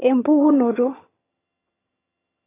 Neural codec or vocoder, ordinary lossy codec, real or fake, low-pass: vocoder, 22.05 kHz, 80 mel bands, HiFi-GAN; AAC, 16 kbps; fake; 3.6 kHz